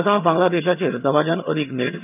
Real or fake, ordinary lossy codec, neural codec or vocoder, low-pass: fake; none; vocoder, 22.05 kHz, 80 mel bands, HiFi-GAN; 3.6 kHz